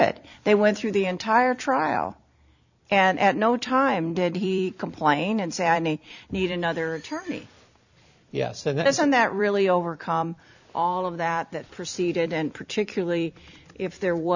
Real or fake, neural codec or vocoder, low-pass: fake; vocoder, 44.1 kHz, 128 mel bands every 256 samples, BigVGAN v2; 7.2 kHz